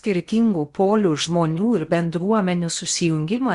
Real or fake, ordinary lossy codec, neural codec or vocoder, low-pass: fake; Opus, 64 kbps; codec, 16 kHz in and 24 kHz out, 0.8 kbps, FocalCodec, streaming, 65536 codes; 10.8 kHz